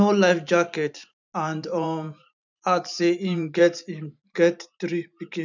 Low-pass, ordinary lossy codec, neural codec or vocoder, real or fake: 7.2 kHz; none; vocoder, 44.1 kHz, 128 mel bands every 512 samples, BigVGAN v2; fake